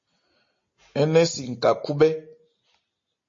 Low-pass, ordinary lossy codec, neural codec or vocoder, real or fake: 7.2 kHz; MP3, 32 kbps; none; real